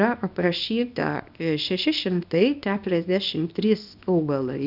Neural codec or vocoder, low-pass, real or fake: codec, 24 kHz, 0.9 kbps, WavTokenizer, small release; 5.4 kHz; fake